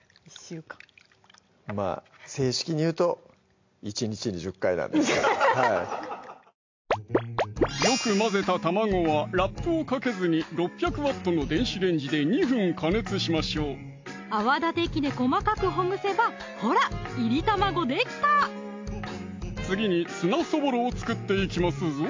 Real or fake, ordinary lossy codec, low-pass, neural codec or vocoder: real; MP3, 48 kbps; 7.2 kHz; none